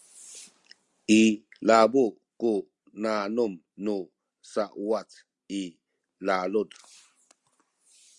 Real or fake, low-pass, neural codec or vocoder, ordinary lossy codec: real; 10.8 kHz; none; Opus, 64 kbps